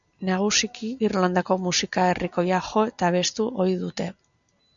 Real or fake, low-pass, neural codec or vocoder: real; 7.2 kHz; none